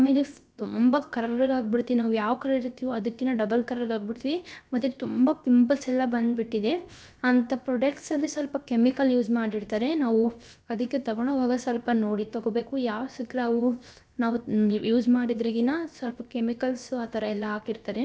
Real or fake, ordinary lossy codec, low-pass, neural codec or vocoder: fake; none; none; codec, 16 kHz, 0.7 kbps, FocalCodec